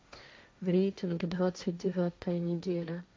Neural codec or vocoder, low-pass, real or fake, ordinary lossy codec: codec, 16 kHz, 1.1 kbps, Voila-Tokenizer; none; fake; none